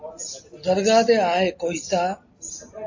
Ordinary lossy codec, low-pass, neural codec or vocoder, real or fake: AAC, 48 kbps; 7.2 kHz; none; real